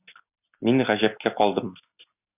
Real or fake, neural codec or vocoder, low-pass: real; none; 3.6 kHz